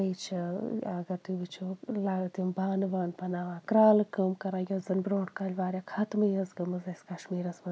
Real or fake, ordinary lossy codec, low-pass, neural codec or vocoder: real; none; none; none